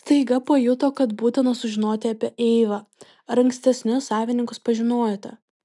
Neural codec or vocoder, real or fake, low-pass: none; real; 10.8 kHz